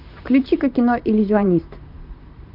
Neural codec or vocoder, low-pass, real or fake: vocoder, 44.1 kHz, 128 mel bands, Pupu-Vocoder; 5.4 kHz; fake